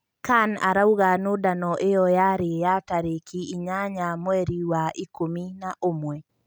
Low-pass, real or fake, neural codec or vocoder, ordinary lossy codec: none; real; none; none